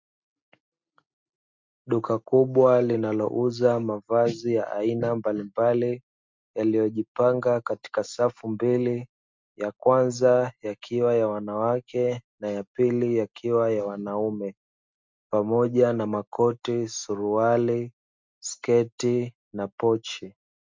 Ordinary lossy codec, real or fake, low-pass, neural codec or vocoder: MP3, 64 kbps; real; 7.2 kHz; none